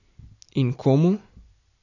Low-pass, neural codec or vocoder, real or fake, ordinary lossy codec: 7.2 kHz; none; real; none